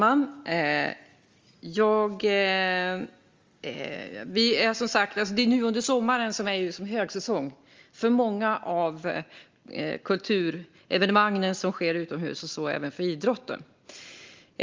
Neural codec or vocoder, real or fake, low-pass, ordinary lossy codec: none; real; 7.2 kHz; Opus, 32 kbps